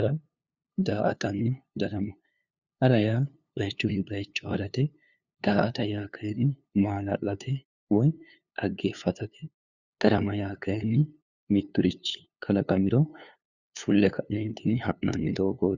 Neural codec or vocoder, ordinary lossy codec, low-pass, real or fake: codec, 16 kHz, 2 kbps, FunCodec, trained on LibriTTS, 25 frames a second; Opus, 64 kbps; 7.2 kHz; fake